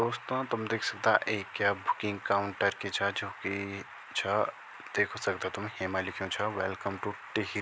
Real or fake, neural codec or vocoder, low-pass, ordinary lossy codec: real; none; none; none